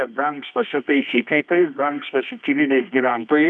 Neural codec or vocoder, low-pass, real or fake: codec, 24 kHz, 0.9 kbps, WavTokenizer, medium music audio release; 10.8 kHz; fake